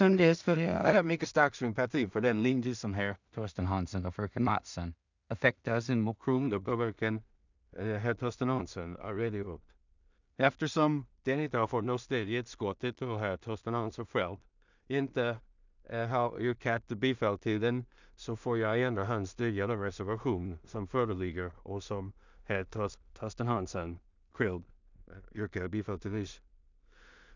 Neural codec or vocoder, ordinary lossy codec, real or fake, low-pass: codec, 16 kHz in and 24 kHz out, 0.4 kbps, LongCat-Audio-Codec, two codebook decoder; none; fake; 7.2 kHz